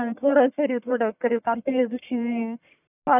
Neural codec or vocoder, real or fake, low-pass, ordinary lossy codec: codec, 44.1 kHz, 1.7 kbps, Pupu-Codec; fake; 3.6 kHz; none